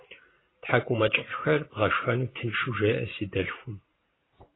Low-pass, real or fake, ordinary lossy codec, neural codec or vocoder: 7.2 kHz; fake; AAC, 16 kbps; vocoder, 44.1 kHz, 128 mel bands every 512 samples, BigVGAN v2